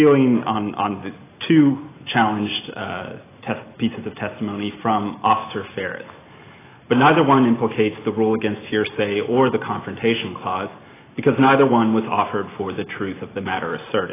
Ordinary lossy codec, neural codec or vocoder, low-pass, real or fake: AAC, 16 kbps; none; 3.6 kHz; real